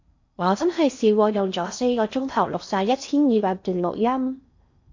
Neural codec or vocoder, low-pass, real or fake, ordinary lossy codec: codec, 16 kHz in and 24 kHz out, 0.6 kbps, FocalCodec, streaming, 4096 codes; 7.2 kHz; fake; AAC, 48 kbps